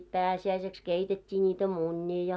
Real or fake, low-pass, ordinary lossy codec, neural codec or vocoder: real; none; none; none